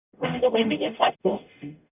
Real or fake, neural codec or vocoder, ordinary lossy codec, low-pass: fake; codec, 44.1 kHz, 0.9 kbps, DAC; none; 3.6 kHz